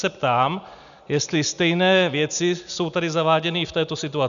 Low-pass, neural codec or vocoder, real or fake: 7.2 kHz; none; real